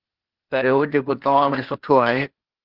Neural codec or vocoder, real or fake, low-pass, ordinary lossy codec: codec, 16 kHz, 0.8 kbps, ZipCodec; fake; 5.4 kHz; Opus, 16 kbps